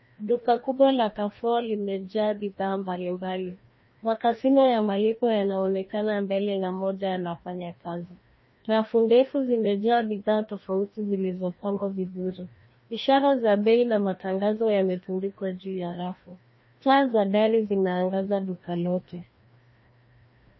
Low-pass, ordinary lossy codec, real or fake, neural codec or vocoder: 7.2 kHz; MP3, 24 kbps; fake; codec, 16 kHz, 1 kbps, FreqCodec, larger model